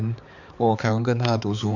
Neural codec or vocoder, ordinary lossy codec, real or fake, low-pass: codec, 16 kHz, 4 kbps, X-Codec, HuBERT features, trained on balanced general audio; none; fake; 7.2 kHz